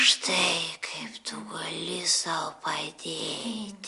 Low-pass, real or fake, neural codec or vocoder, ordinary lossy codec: 10.8 kHz; real; none; Opus, 64 kbps